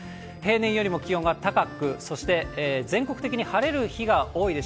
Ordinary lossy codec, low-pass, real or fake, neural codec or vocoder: none; none; real; none